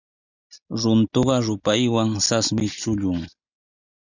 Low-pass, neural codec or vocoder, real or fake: 7.2 kHz; none; real